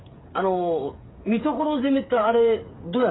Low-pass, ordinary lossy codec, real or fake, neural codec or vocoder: 7.2 kHz; AAC, 16 kbps; fake; codec, 16 kHz, 8 kbps, FreqCodec, smaller model